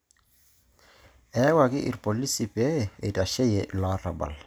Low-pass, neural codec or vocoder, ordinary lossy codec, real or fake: none; none; none; real